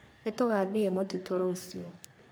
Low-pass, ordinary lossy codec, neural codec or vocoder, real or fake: none; none; codec, 44.1 kHz, 3.4 kbps, Pupu-Codec; fake